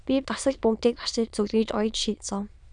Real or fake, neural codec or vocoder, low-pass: fake; autoencoder, 22.05 kHz, a latent of 192 numbers a frame, VITS, trained on many speakers; 9.9 kHz